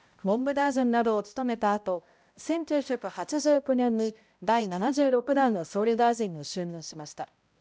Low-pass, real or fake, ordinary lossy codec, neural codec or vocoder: none; fake; none; codec, 16 kHz, 0.5 kbps, X-Codec, HuBERT features, trained on balanced general audio